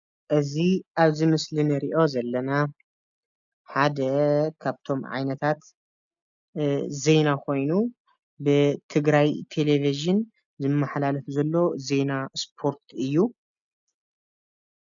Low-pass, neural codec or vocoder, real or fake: 7.2 kHz; none; real